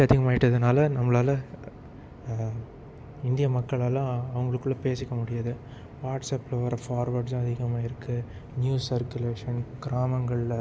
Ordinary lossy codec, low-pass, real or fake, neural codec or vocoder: none; none; real; none